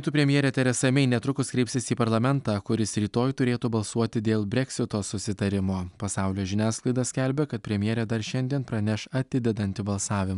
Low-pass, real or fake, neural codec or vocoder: 10.8 kHz; real; none